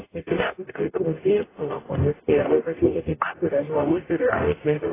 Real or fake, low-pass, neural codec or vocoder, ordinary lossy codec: fake; 3.6 kHz; codec, 44.1 kHz, 0.9 kbps, DAC; AAC, 16 kbps